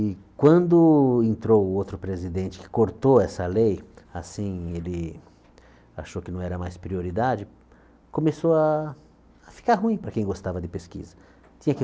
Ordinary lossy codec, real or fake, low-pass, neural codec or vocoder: none; real; none; none